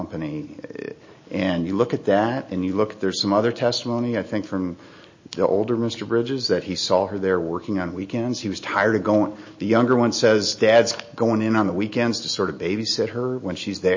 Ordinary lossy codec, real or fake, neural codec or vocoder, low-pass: MP3, 32 kbps; real; none; 7.2 kHz